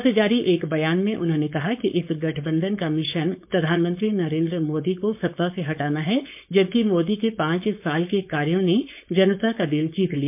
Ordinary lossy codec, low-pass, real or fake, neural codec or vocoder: MP3, 32 kbps; 3.6 kHz; fake; codec, 16 kHz, 4.8 kbps, FACodec